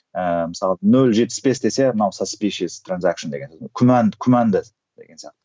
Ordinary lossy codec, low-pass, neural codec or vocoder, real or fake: none; none; none; real